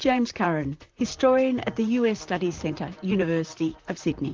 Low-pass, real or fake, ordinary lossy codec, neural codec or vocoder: 7.2 kHz; fake; Opus, 24 kbps; vocoder, 44.1 kHz, 128 mel bands, Pupu-Vocoder